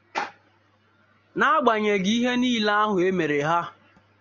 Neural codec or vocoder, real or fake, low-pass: none; real; 7.2 kHz